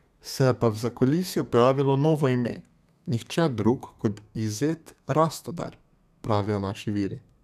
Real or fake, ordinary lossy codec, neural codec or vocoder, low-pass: fake; none; codec, 32 kHz, 1.9 kbps, SNAC; 14.4 kHz